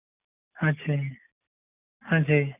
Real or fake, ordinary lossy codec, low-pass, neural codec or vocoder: real; none; 3.6 kHz; none